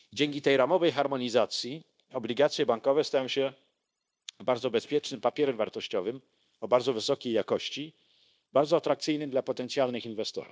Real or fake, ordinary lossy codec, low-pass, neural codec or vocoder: fake; none; none; codec, 16 kHz, 0.9 kbps, LongCat-Audio-Codec